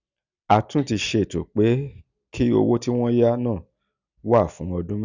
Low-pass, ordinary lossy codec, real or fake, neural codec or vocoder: 7.2 kHz; none; real; none